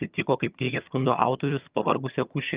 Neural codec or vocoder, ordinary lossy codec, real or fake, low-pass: vocoder, 22.05 kHz, 80 mel bands, HiFi-GAN; Opus, 32 kbps; fake; 3.6 kHz